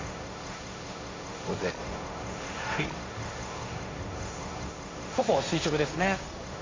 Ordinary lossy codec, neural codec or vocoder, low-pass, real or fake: AAC, 32 kbps; codec, 16 kHz, 1.1 kbps, Voila-Tokenizer; 7.2 kHz; fake